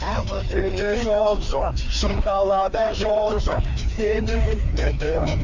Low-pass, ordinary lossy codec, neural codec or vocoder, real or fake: 7.2 kHz; none; codec, 16 kHz, 2 kbps, FreqCodec, larger model; fake